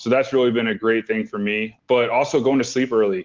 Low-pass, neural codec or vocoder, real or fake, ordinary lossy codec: 7.2 kHz; none; real; Opus, 16 kbps